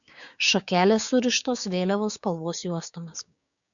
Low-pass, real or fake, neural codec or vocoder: 7.2 kHz; fake; codec, 16 kHz, 6 kbps, DAC